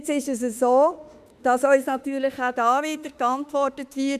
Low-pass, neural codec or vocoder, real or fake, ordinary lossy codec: 14.4 kHz; autoencoder, 48 kHz, 32 numbers a frame, DAC-VAE, trained on Japanese speech; fake; none